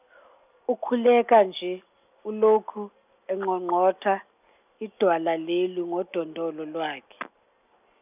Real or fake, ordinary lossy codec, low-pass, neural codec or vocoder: real; none; 3.6 kHz; none